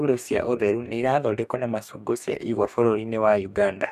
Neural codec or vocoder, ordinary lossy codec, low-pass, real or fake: codec, 44.1 kHz, 2.6 kbps, DAC; none; 14.4 kHz; fake